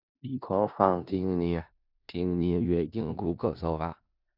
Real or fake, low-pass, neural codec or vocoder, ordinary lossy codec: fake; 5.4 kHz; codec, 16 kHz in and 24 kHz out, 0.4 kbps, LongCat-Audio-Codec, four codebook decoder; none